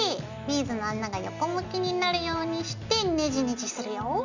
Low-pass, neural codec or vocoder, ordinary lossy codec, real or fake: 7.2 kHz; none; none; real